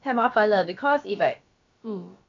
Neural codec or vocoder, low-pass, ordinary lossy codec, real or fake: codec, 16 kHz, about 1 kbps, DyCAST, with the encoder's durations; 7.2 kHz; MP3, 48 kbps; fake